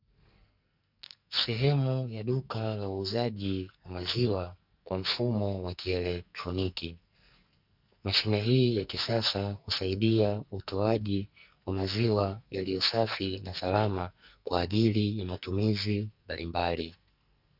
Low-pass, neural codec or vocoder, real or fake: 5.4 kHz; codec, 44.1 kHz, 2.6 kbps, SNAC; fake